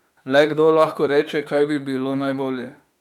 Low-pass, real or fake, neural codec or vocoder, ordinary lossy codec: 19.8 kHz; fake; autoencoder, 48 kHz, 32 numbers a frame, DAC-VAE, trained on Japanese speech; none